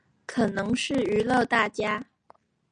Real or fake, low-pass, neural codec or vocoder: real; 9.9 kHz; none